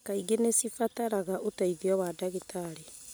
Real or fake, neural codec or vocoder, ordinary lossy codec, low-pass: real; none; none; none